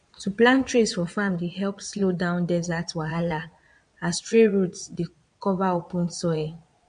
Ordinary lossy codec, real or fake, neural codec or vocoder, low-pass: MP3, 64 kbps; fake; vocoder, 22.05 kHz, 80 mel bands, Vocos; 9.9 kHz